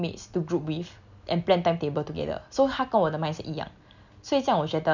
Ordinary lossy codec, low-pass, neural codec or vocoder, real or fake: none; 7.2 kHz; none; real